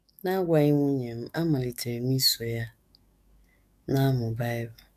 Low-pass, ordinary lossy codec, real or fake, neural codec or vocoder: 14.4 kHz; none; fake; codec, 44.1 kHz, 7.8 kbps, DAC